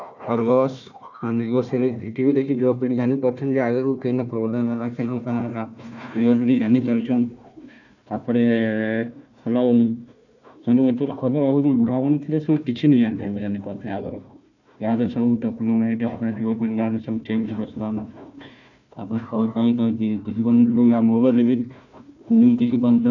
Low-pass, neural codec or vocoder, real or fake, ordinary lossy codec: 7.2 kHz; codec, 16 kHz, 1 kbps, FunCodec, trained on Chinese and English, 50 frames a second; fake; none